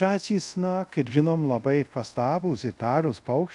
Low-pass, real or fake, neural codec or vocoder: 10.8 kHz; fake; codec, 24 kHz, 0.5 kbps, DualCodec